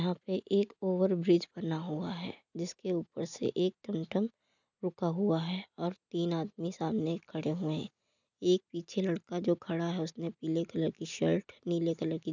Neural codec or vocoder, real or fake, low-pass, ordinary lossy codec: none; real; 7.2 kHz; none